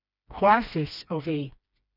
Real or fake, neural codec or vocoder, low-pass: fake; codec, 16 kHz, 2 kbps, FreqCodec, smaller model; 5.4 kHz